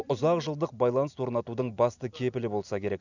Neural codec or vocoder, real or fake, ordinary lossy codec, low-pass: none; real; none; 7.2 kHz